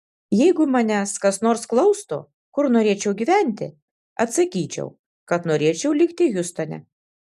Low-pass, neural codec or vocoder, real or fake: 14.4 kHz; none; real